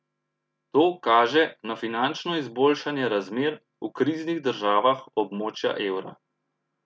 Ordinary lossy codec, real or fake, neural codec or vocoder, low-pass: none; real; none; none